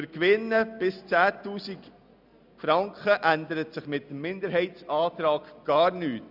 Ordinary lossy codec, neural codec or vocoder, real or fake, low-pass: none; none; real; 5.4 kHz